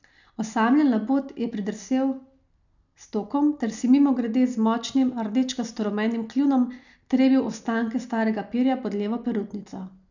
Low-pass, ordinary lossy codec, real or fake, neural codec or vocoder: 7.2 kHz; none; real; none